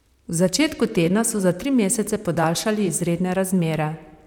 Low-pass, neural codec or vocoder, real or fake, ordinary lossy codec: 19.8 kHz; vocoder, 44.1 kHz, 128 mel bands, Pupu-Vocoder; fake; none